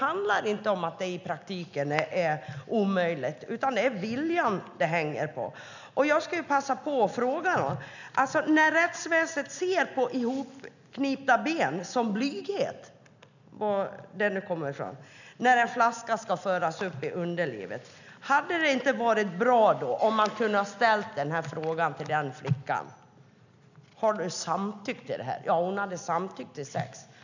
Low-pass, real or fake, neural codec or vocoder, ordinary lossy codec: 7.2 kHz; real; none; none